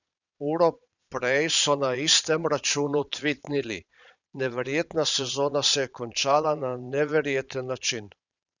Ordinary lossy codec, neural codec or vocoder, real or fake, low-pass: none; vocoder, 22.05 kHz, 80 mel bands, Vocos; fake; 7.2 kHz